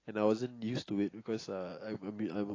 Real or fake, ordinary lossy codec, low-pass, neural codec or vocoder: real; AAC, 32 kbps; 7.2 kHz; none